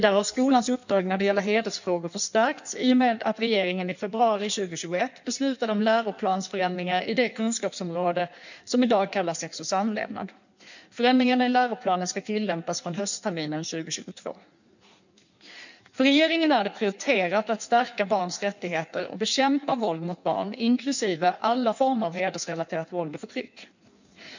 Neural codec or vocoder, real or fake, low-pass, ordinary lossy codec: codec, 16 kHz in and 24 kHz out, 1.1 kbps, FireRedTTS-2 codec; fake; 7.2 kHz; none